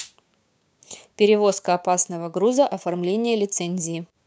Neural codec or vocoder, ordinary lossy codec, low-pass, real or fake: codec, 16 kHz, 6 kbps, DAC; none; none; fake